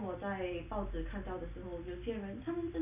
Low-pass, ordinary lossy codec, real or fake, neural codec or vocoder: 3.6 kHz; none; real; none